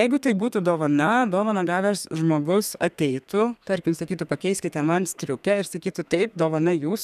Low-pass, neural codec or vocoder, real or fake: 14.4 kHz; codec, 32 kHz, 1.9 kbps, SNAC; fake